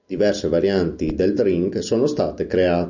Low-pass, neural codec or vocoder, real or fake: 7.2 kHz; none; real